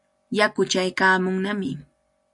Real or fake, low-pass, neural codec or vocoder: real; 10.8 kHz; none